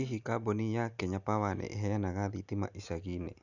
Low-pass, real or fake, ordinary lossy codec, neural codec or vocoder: 7.2 kHz; real; none; none